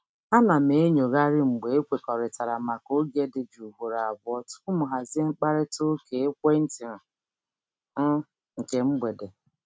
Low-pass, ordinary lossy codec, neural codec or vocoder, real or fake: none; none; none; real